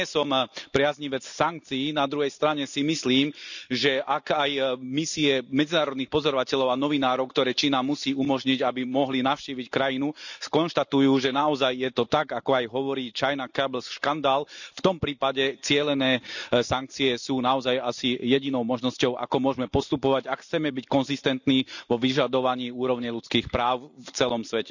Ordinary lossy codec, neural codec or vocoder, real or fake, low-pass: none; none; real; 7.2 kHz